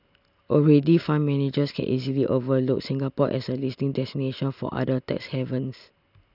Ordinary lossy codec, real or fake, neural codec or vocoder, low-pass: none; real; none; 5.4 kHz